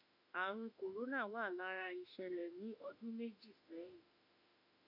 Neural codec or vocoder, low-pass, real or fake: autoencoder, 48 kHz, 32 numbers a frame, DAC-VAE, trained on Japanese speech; 5.4 kHz; fake